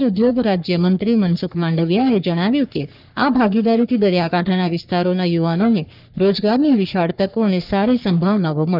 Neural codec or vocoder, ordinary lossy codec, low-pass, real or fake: codec, 44.1 kHz, 3.4 kbps, Pupu-Codec; none; 5.4 kHz; fake